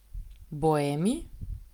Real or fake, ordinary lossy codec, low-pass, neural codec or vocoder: real; Opus, 32 kbps; 19.8 kHz; none